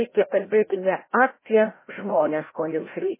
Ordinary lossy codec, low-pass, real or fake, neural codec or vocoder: MP3, 16 kbps; 3.6 kHz; fake; codec, 16 kHz, 0.5 kbps, FreqCodec, larger model